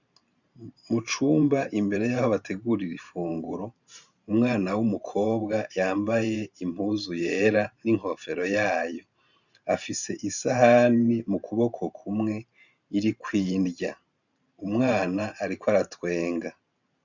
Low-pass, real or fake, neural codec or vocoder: 7.2 kHz; fake; vocoder, 44.1 kHz, 128 mel bands every 512 samples, BigVGAN v2